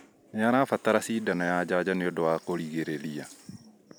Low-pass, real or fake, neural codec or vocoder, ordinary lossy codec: none; real; none; none